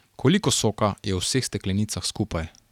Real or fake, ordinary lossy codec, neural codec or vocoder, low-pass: real; none; none; 19.8 kHz